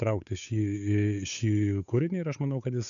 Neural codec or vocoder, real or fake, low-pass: codec, 16 kHz, 8 kbps, FunCodec, trained on Chinese and English, 25 frames a second; fake; 7.2 kHz